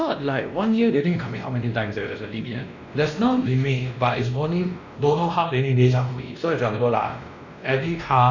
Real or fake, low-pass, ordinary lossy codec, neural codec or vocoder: fake; 7.2 kHz; none; codec, 16 kHz, 1 kbps, X-Codec, WavLM features, trained on Multilingual LibriSpeech